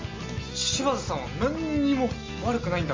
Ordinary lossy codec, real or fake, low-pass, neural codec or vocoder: MP3, 32 kbps; real; 7.2 kHz; none